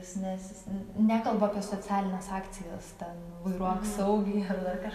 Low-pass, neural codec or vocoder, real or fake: 14.4 kHz; none; real